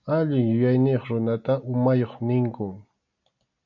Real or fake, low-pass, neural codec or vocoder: real; 7.2 kHz; none